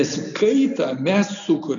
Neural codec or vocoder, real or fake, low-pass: none; real; 7.2 kHz